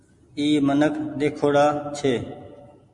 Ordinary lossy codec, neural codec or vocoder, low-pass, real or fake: MP3, 48 kbps; none; 10.8 kHz; real